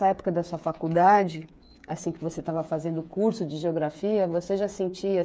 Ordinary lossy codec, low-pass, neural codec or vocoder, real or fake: none; none; codec, 16 kHz, 8 kbps, FreqCodec, smaller model; fake